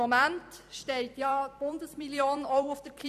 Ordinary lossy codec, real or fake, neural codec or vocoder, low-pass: MP3, 96 kbps; fake; vocoder, 44.1 kHz, 128 mel bands every 256 samples, BigVGAN v2; 14.4 kHz